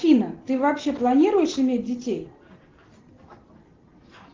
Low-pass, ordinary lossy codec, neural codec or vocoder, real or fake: 7.2 kHz; Opus, 16 kbps; none; real